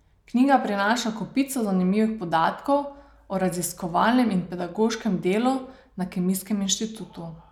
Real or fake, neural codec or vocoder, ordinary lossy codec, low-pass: real; none; none; 19.8 kHz